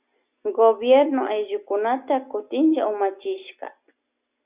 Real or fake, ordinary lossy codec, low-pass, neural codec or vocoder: real; Opus, 64 kbps; 3.6 kHz; none